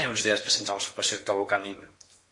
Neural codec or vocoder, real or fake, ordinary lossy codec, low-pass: codec, 16 kHz in and 24 kHz out, 0.8 kbps, FocalCodec, streaming, 65536 codes; fake; MP3, 48 kbps; 10.8 kHz